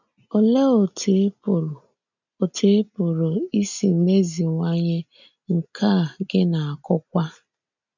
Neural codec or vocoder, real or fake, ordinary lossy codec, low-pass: none; real; none; 7.2 kHz